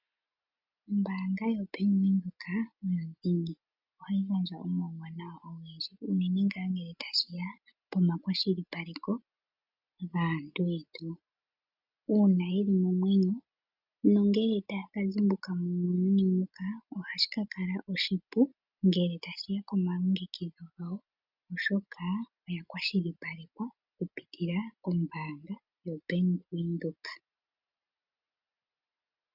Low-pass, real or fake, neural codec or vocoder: 5.4 kHz; real; none